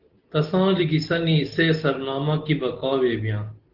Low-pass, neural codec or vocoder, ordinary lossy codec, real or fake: 5.4 kHz; none; Opus, 16 kbps; real